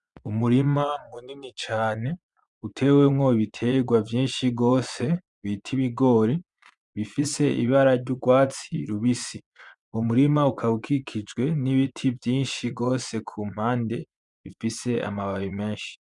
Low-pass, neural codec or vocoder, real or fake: 10.8 kHz; vocoder, 24 kHz, 100 mel bands, Vocos; fake